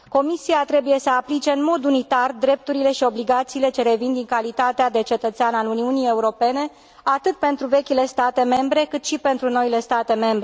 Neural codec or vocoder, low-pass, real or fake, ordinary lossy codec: none; none; real; none